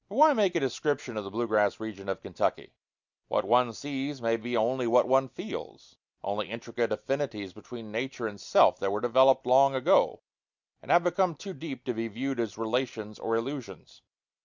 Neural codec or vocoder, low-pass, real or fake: none; 7.2 kHz; real